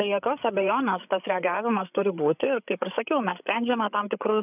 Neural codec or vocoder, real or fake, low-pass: codec, 16 kHz, 8 kbps, FreqCodec, larger model; fake; 3.6 kHz